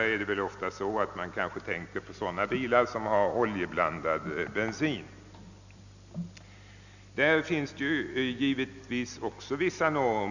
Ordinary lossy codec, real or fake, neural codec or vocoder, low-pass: none; real; none; 7.2 kHz